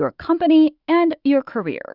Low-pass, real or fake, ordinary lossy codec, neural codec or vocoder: 5.4 kHz; fake; Opus, 64 kbps; autoencoder, 48 kHz, 128 numbers a frame, DAC-VAE, trained on Japanese speech